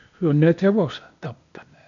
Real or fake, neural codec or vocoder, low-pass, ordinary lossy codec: fake; codec, 16 kHz, 0.8 kbps, ZipCodec; 7.2 kHz; none